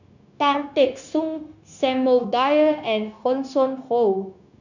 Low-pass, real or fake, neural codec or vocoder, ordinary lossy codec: 7.2 kHz; fake; codec, 16 kHz, 0.9 kbps, LongCat-Audio-Codec; none